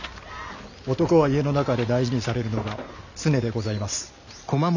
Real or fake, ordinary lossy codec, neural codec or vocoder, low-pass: real; none; none; 7.2 kHz